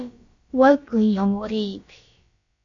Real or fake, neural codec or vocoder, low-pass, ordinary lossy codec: fake; codec, 16 kHz, about 1 kbps, DyCAST, with the encoder's durations; 7.2 kHz; AAC, 48 kbps